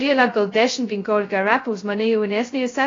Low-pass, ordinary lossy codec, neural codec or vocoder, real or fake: 7.2 kHz; AAC, 32 kbps; codec, 16 kHz, 0.2 kbps, FocalCodec; fake